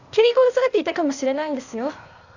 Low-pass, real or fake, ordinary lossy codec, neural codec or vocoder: 7.2 kHz; fake; none; codec, 16 kHz, 0.8 kbps, ZipCodec